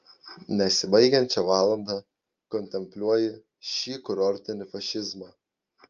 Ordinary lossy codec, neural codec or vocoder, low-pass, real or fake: Opus, 24 kbps; none; 7.2 kHz; real